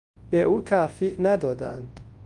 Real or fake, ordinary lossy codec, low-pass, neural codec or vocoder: fake; Opus, 24 kbps; 10.8 kHz; codec, 24 kHz, 0.9 kbps, WavTokenizer, large speech release